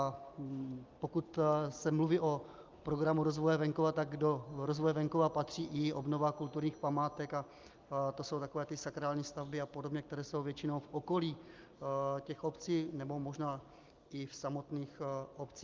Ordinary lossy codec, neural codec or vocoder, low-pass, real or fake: Opus, 24 kbps; none; 7.2 kHz; real